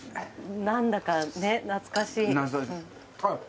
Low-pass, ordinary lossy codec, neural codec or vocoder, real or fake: none; none; none; real